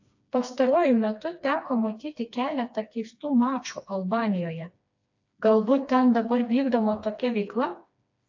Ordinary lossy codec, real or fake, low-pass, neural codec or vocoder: AAC, 48 kbps; fake; 7.2 kHz; codec, 16 kHz, 2 kbps, FreqCodec, smaller model